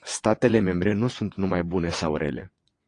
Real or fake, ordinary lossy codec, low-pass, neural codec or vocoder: fake; AAC, 48 kbps; 9.9 kHz; vocoder, 22.05 kHz, 80 mel bands, WaveNeXt